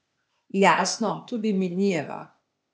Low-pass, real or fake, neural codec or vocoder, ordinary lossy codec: none; fake; codec, 16 kHz, 0.8 kbps, ZipCodec; none